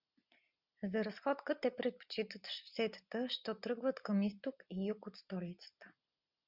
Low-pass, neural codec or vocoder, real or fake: 5.4 kHz; none; real